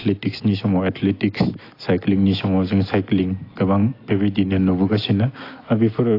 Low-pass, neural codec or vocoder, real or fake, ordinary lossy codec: 5.4 kHz; none; real; AAC, 32 kbps